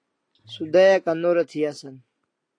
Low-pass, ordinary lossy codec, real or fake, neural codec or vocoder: 9.9 kHz; AAC, 48 kbps; real; none